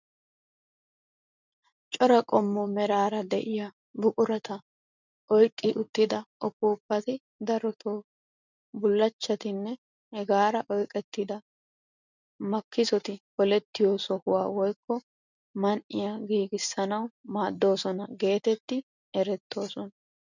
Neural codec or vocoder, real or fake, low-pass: none; real; 7.2 kHz